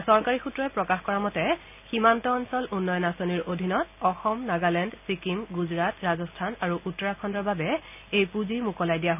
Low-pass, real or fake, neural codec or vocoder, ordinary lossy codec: 3.6 kHz; real; none; none